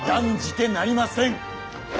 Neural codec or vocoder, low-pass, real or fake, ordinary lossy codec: none; none; real; none